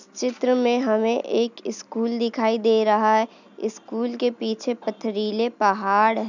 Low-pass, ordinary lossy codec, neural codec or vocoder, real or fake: 7.2 kHz; none; none; real